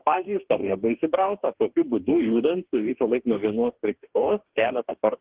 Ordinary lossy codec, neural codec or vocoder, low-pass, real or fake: Opus, 16 kbps; codec, 44.1 kHz, 2.6 kbps, DAC; 3.6 kHz; fake